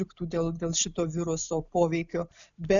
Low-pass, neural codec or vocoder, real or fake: 7.2 kHz; none; real